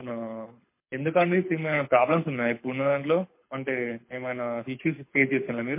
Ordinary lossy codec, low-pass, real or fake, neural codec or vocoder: MP3, 24 kbps; 3.6 kHz; fake; codec, 24 kHz, 6 kbps, HILCodec